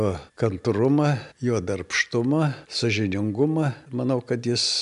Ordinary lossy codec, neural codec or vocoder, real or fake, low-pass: MP3, 96 kbps; none; real; 10.8 kHz